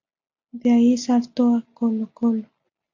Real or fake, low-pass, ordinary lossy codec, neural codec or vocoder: real; 7.2 kHz; AAC, 48 kbps; none